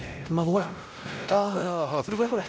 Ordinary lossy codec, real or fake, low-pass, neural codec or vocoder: none; fake; none; codec, 16 kHz, 0.5 kbps, X-Codec, WavLM features, trained on Multilingual LibriSpeech